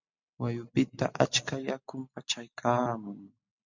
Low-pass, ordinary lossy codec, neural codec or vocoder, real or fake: 7.2 kHz; MP3, 64 kbps; none; real